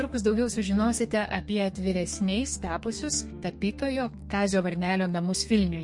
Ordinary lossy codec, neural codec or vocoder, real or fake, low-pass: MP3, 48 kbps; codec, 32 kHz, 1.9 kbps, SNAC; fake; 10.8 kHz